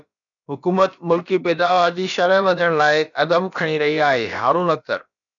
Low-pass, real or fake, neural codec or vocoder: 7.2 kHz; fake; codec, 16 kHz, about 1 kbps, DyCAST, with the encoder's durations